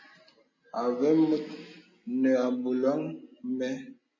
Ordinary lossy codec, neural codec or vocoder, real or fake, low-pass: MP3, 32 kbps; none; real; 7.2 kHz